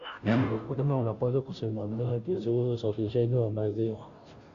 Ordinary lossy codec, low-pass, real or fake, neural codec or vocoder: Opus, 64 kbps; 7.2 kHz; fake; codec, 16 kHz, 0.5 kbps, FunCodec, trained on Chinese and English, 25 frames a second